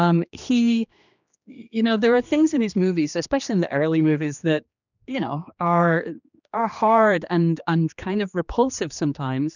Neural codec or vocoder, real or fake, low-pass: codec, 16 kHz, 2 kbps, X-Codec, HuBERT features, trained on general audio; fake; 7.2 kHz